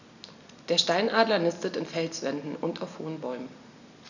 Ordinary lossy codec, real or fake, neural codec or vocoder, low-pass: none; real; none; 7.2 kHz